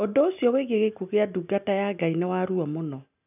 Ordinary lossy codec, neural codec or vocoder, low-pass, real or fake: none; none; 3.6 kHz; real